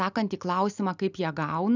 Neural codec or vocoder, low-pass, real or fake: none; 7.2 kHz; real